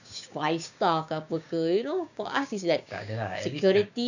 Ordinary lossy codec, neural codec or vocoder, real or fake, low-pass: none; codec, 16 kHz, 6 kbps, DAC; fake; 7.2 kHz